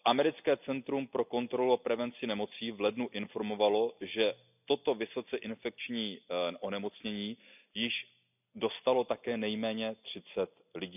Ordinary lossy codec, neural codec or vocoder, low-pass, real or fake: none; none; 3.6 kHz; real